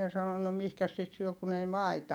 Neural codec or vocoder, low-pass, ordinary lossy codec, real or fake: codec, 44.1 kHz, 7.8 kbps, DAC; 19.8 kHz; none; fake